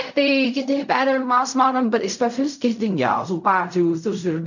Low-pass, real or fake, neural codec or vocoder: 7.2 kHz; fake; codec, 16 kHz in and 24 kHz out, 0.4 kbps, LongCat-Audio-Codec, fine tuned four codebook decoder